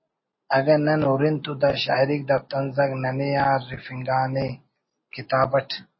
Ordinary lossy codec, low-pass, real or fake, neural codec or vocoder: MP3, 24 kbps; 7.2 kHz; real; none